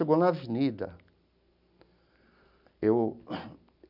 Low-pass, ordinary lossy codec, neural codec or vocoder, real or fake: 5.4 kHz; none; none; real